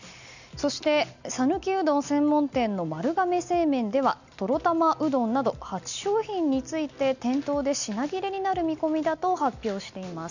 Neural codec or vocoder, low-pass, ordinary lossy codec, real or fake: none; 7.2 kHz; none; real